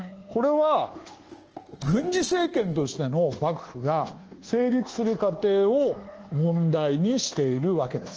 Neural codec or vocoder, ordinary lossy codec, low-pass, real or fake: codec, 24 kHz, 1.2 kbps, DualCodec; Opus, 16 kbps; 7.2 kHz; fake